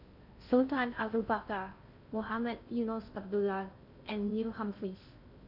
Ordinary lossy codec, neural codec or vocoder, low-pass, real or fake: none; codec, 16 kHz in and 24 kHz out, 0.6 kbps, FocalCodec, streaming, 4096 codes; 5.4 kHz; fake